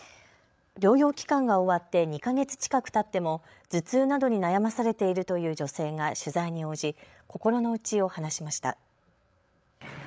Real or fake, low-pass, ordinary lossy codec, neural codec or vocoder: fake; none; none; codec, 16 kHz, 8 kbps, FreqCodec, larger model